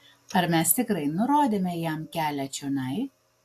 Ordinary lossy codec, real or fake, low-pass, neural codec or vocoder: AAC, 64 kbps; real; 14.4 kHz; none